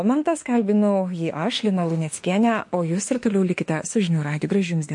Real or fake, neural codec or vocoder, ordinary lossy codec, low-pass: fake; autoencoder, 48 kHz, 32 numbers a frame, DAC-VAE, trained on Japanese speech; MP3, 48 kbps; 10.8 kHz